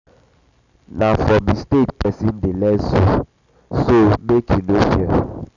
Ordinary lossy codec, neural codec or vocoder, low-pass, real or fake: none; none; 7.2 kHz; real